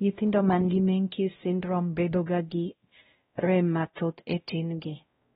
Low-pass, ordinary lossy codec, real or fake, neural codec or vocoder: 7.2 kHz; AAC, 16 kbps; fake; codec, 16 kHz, 0.5 kbps, X-Codec, WavLM features, trained on Multilingual LibriSpeech